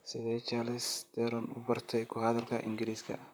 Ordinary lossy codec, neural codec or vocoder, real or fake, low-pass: none; vocoder, 44.1 kHz, 128 mel bands, Pupu-Vocoder; fake; none